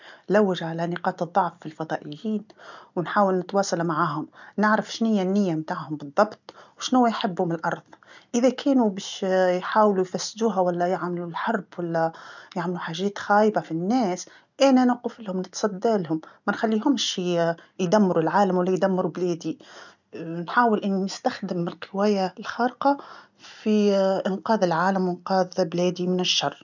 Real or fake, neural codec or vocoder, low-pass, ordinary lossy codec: real; none; 7.2 kHz; none